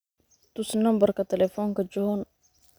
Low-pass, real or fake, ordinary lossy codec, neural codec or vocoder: none; real; none; none